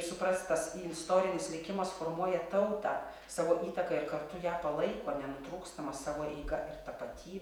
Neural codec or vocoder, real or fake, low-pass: vocoder, 48 kHz, 128 mel bands, Vocos; fake; 19.8 kHz